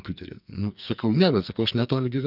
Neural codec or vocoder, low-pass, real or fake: codec, 44.1 kHz, 2.6 kbps, SNAC; 5.4 kHz; fake